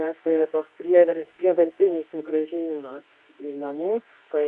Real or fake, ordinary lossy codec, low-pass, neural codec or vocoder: fake; Opus, 64 kbps; 10.8 kHz; codec, 24 kHz, 0.9 kbps, WavTokenizer, medium music audio release